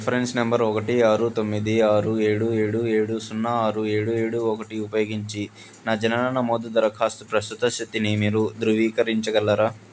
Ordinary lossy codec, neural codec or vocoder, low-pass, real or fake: none; none; none; real